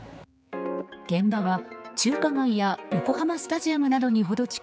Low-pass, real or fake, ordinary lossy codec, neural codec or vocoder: none; fake; none; codec, 16 kHz, 4 kbps, X-Codec, HuBERT features, trained on general audio